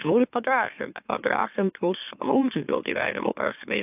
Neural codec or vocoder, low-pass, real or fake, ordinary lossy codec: autoencoder, 44.1 kHz, a latent of 192 numbers a frame, MeloTTS; 3.6 kHz; fake; AAC, 32 kbps